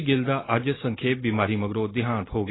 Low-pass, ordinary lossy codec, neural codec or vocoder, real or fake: 7.2 kHz; AAC, 16 kbps; none; real